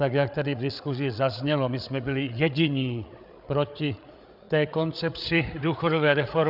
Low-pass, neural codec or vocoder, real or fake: 5.4 kHz; codec, 16 kHz, 8 kbps, FreqCodec, larger model; fake